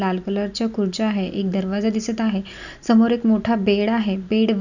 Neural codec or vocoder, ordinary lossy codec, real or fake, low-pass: none; none; real; 7.2 kHz